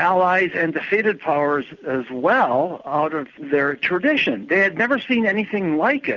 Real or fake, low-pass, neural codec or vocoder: real; 7.2 kHz; none